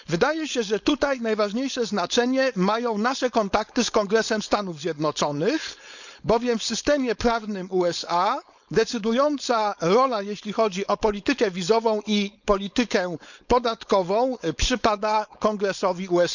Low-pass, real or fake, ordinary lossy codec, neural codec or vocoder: 7.2 kHz; fake; none; codec, 16 kHz, 4.8 kbps, FACodec